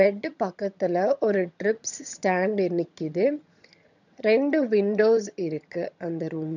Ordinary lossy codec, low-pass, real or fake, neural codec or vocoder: none; 7.2 kHz; fake; vocoder, 22.05 kHz, 80 mel bands, HiFi-GAN